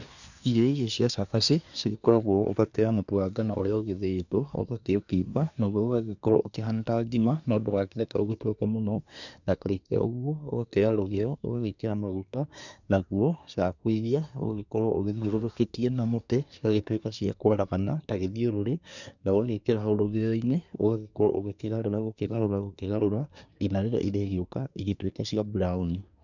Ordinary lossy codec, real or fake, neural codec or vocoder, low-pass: none; fake; codec, 24 kHz, 1 kbps, SNAC; 7.2 kHz